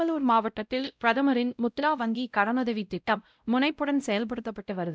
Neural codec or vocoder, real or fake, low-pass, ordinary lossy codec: codec, 16 kHz, 0.5 kbps, X-Codec, WavLM features, trained on Multilingual LibriSpeech; fake; none; none